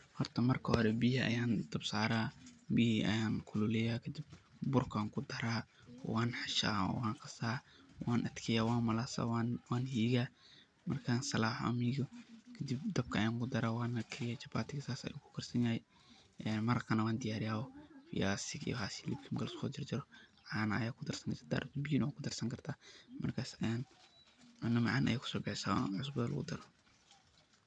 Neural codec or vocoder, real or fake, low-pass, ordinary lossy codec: none; real; 9.9 kHz; none